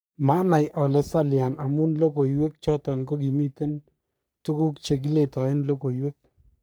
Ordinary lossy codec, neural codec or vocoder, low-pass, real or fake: none; codec, 44.1 kHz, 3.4 kbps, Pupu-Codec; none; fake